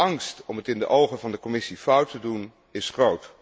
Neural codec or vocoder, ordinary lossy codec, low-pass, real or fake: none; none; none; real